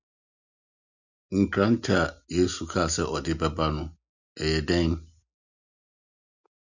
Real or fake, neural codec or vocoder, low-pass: real; none; 7.2 kHz